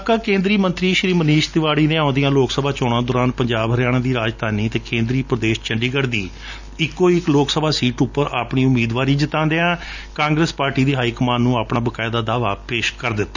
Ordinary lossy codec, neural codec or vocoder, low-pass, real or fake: none; none; 7.2 kHz; real